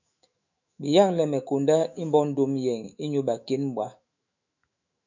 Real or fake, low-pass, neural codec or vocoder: fake; 7.2 kHz; autoencoder, 48 kHz, 128 numbers a frame, DAC-VAE, trained on Japanese speech